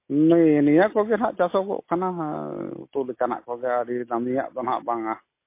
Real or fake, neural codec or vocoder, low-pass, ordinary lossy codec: real; none; 3.6 kHz; MP3, 24 kbps